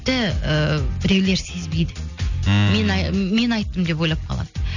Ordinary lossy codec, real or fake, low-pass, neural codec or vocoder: none; real; 7.2 kHz; none